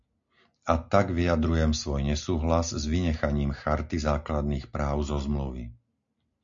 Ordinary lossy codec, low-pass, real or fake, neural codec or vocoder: MP3, 64 kbps; 7.2 kHz; real; none